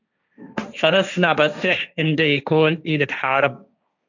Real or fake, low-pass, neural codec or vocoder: fake; 7.2 kHz; codec, 16 kHz, 1.1 kbps, Voila-Tokenizer